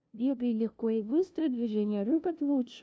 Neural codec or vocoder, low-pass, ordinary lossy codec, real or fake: codec, 16 kHz, 0.5 kbps, FunCodec, trained on LibriTTS, 25 frames a second; none; none; fake